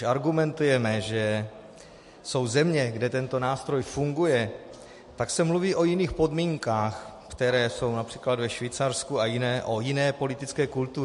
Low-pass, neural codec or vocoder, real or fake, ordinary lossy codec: 14.4 kHz; none; real; MP3, 48 kbps